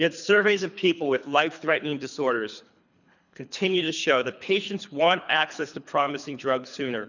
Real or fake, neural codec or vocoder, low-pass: fake; codec, 24 kHz, 3 kbps, HILCodec; 7.2 kHz